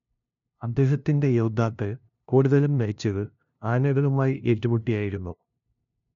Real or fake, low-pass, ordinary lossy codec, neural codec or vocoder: fake; 7.2 kHz; none; codec, 16 kHz, 0.5 kbps, FunCodec, trained on LibriTTS, 25 frames a second